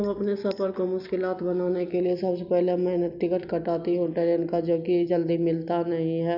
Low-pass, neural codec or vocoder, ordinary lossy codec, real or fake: 5.4 kHz; none; none; real